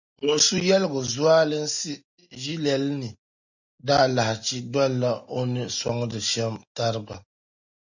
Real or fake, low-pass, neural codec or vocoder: real; 7.2 kHz; none